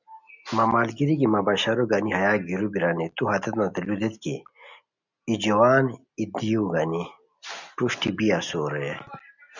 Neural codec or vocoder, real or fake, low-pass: none; real; 7.2 kHz